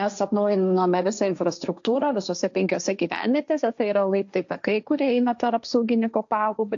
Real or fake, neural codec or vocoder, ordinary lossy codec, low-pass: fake; codec, 16 kHz, 1.1 kbps, Voila-Tokenizer; AAC, 64 kbps; 7.2 kHz